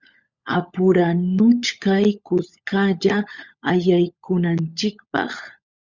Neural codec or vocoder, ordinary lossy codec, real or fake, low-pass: codec, 16 kHz, 16 kbps, FunCodec, trained on LibriTTS, 50 frames a second; Opus, 64 kbps; fake; 7.2 kHz